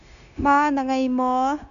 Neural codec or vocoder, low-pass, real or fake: codec, 16 kHz, 0.9 kbps, LongCat-Audio-Codec; 7.2 kHz; fake